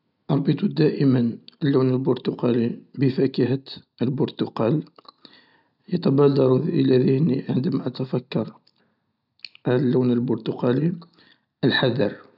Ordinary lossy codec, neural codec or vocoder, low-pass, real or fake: none; none; 5.4 kHz; real